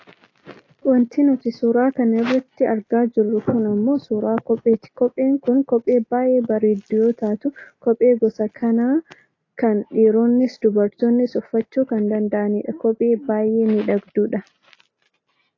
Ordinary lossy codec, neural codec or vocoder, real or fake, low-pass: AAC, 32 kbps; none; real; 7.2 kHz